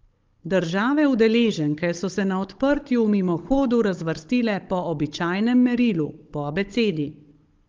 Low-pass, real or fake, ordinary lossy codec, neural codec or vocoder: 7.2 kHz; fake; Opus, 32 kbps; codec, 16 kHz, 16 kbps, FunCodec, trained on Chinese and English, 50 frames a second